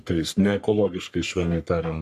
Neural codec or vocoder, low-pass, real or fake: codec, 44.1 kHz, 3.4 kbps, Pupu-Codec; 14.4 kHz; fake